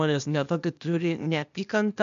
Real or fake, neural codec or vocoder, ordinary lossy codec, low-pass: fake; codec, 16 kHz, 0.8 kbps, ZipCodec; MP3, 64 kbps; 7.2 kHz